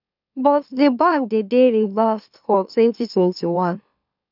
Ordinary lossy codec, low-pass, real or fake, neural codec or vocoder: none; 5.4 kHz; fake; autoencoder, 44.1 kHz, a latent of 192 numbers a frame, MeloTTS